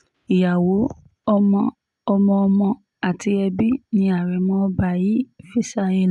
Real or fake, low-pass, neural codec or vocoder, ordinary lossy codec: real; none; none; none